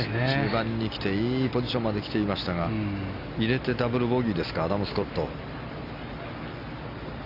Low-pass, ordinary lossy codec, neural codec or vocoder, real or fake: 5.4 kHz; none; none; real